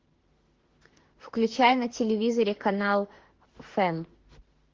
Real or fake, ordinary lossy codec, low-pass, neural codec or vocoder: fake; Opus, 16 kbps; 7.2 kHz; autoencoder, 48 kHz, 32 numbers a frame, DAC-VAE, trained on Japanese speech